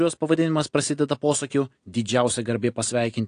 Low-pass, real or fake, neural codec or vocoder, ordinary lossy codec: 9.9 kHz; real; none; AAC, 48 kbps